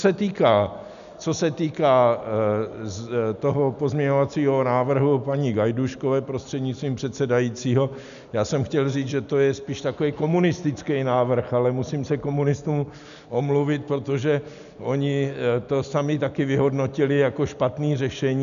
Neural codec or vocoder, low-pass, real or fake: none; 7.2 kHz; real